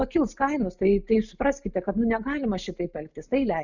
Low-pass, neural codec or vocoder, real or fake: 7.2 kHz; none; real